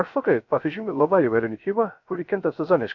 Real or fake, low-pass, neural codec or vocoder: fake; 7.2 kHz; codec, 16 kHz, 0.3 kbps, FocalCodec